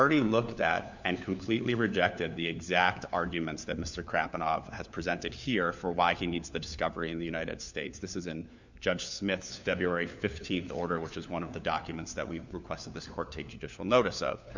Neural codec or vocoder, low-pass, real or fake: codec, 16 kHz, 4 kbps, FunCodec, trained on LibriTTS, 50 frames a second; 7.2 kHz; fake